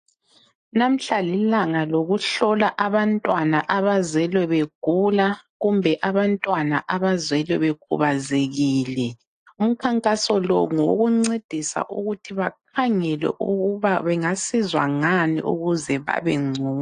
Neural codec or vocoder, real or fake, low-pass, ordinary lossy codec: none; real; 9.9 kHz; AAC, 48 kbps